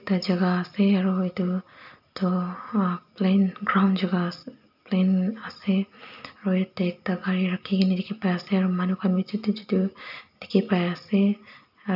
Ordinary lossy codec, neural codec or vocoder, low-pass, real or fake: none; none; 5.4 kHz; real